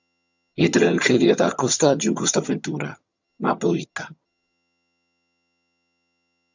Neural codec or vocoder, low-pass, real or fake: vocoder, 22.05 kHz, 80 mel bands, HiFi-GAN; 7.2 kHz; fake